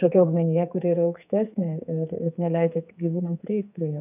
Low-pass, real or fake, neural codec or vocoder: 3.6 kHz; fake; codec, 44.1 kHz, 2.6 kbps, SNAC